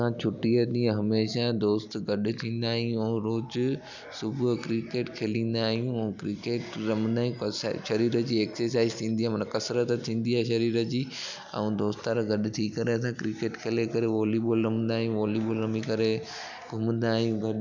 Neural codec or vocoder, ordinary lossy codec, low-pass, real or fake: none; none; 7.2 kHz; real